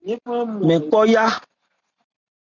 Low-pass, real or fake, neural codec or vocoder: 7.2 kHz; real; none